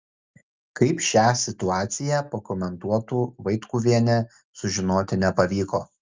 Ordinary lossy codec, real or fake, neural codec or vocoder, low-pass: Opus, 24 kbps; real; none; 7.2 kHz